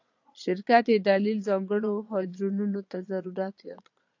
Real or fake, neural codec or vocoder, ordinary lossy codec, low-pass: fake; vocoder, 22.05 kHz, 80 mel bands, Vocos; AAC, 48 kbps; 7.2 kHz